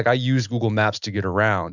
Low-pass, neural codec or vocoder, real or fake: 7.2 kHz; none; real